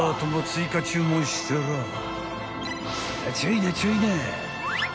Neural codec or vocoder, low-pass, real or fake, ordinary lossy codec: none; none; real; none